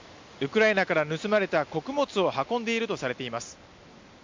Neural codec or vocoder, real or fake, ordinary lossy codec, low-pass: none; real; MP3, 64 kbps; 7.2 kHz